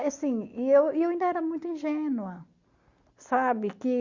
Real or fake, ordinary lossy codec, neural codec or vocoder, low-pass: real; Opus, 64 kbps; none; 7.2 kHz